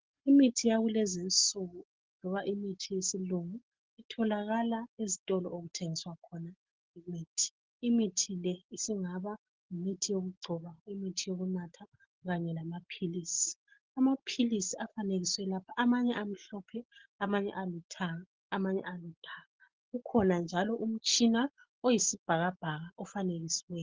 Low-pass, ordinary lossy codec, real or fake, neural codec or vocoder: 7.2 kHz; Opus, 16 kbps; real; none